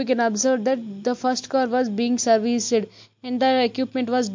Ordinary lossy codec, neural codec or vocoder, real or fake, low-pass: MP3, 48 kbps; none; real; 7.2 kHz